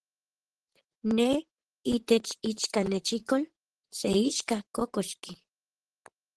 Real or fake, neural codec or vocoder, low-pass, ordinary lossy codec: fake; vocoder, 44.1 kHz, 128 mel bands, Pupu-Vocoder; 10.8 kHz; Opus, 16 kbps